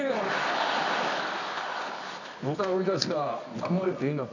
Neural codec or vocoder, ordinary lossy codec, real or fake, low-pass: codec, 24 kHz, 0.9 kbps, WavTokenizer, medium music audio release; none; fake; 7.2 kHz